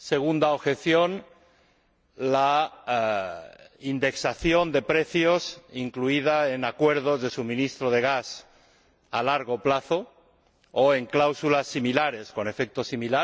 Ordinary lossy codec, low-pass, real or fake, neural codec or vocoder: none; none; real; none